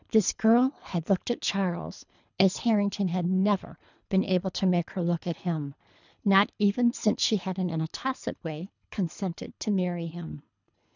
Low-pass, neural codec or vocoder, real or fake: 7.2 kHz; codec, 24 kHz, 3 kbps, HILCodec; fake